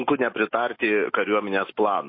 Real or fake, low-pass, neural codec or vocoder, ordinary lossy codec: real; 5.4 kHz; none; MP3, 24 kbps